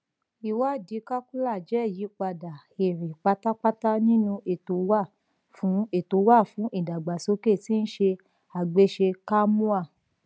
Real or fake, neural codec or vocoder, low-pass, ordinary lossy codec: real; none; none; none